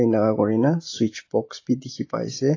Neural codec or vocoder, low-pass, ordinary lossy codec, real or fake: none; 7.2 kHz; AAC, 32 kbps; real